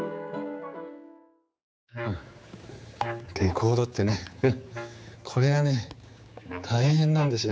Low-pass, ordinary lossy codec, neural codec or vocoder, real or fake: none; none; codec, 16 kHz, 4 kbps, X-Codec, HuBERT features, trained on general audio; fake